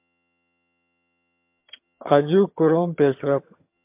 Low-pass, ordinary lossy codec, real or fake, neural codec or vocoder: 3.6 kHz; MP3, 32 kbps; fake; vocoder, 22.05 kHz, 80 mel bands, HiFi-GAN